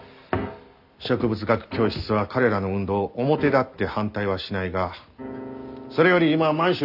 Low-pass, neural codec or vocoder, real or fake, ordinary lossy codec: 5.4 kHz; none; real; none